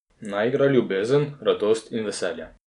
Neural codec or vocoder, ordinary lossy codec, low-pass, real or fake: none; none; 10.8 kHz; real